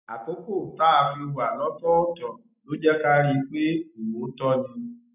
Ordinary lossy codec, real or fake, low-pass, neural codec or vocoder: none; real; 3.6 kHz; none